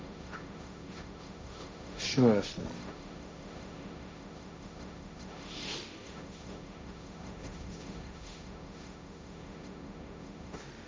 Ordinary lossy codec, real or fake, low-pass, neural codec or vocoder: none; fake; none; codec, 16 kHz, 1.1 kbps, Voila-Tokenizer